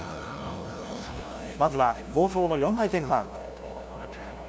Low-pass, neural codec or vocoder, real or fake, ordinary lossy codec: none; codec, 16 kHz, 0.5 kbps, FunCodec, trained on LibriTTS, 25 frames a second; fake; none